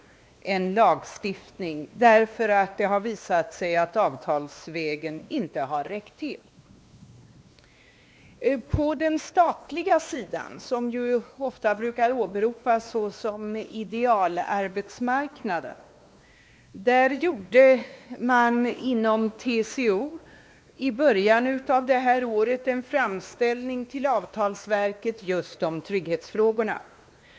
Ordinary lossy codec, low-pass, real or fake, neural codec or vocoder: none; none; fake; codec, 16 kHz, 2 kbps, X-Codec, WavLM features, trained on Multilingual LibriSpeech